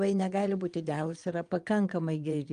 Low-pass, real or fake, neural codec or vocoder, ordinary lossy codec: 9.9 kHz; fake; vocoder, 22.05 kHz, 80 mel bands, Vocos; Opus, 32 kbps